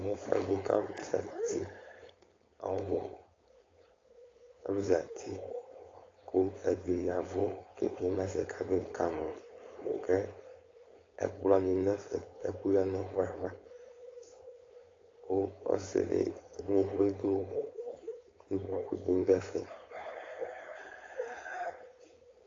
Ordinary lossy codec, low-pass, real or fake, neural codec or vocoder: AAC, 32 kbps; 7.2 kHz; fake; codec, 16 kHz, 4.8 kbps, FACodec